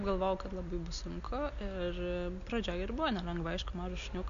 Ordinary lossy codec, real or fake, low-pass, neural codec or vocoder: MP3, 64 kbps; real; 7.2 kHz; none